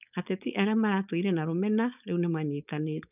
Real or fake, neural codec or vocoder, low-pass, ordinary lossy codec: fake; codec, 16 kHz, 4.8 kbps, FACodec; 3.6 kHz; none